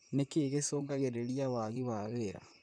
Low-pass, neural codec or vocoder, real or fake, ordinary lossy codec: 9.9 kHz; vocoder, 44.1 kHz, 128 mel bands, Pupu-Vocoder; fake; none